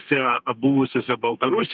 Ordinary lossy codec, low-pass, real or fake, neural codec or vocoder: Opus, 24 kbps; 7.2 kHz; fake; codec, 32 kHz, 1.9 kbps, SNAC